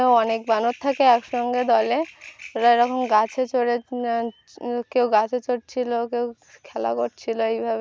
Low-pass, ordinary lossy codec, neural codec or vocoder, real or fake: none; none; none; real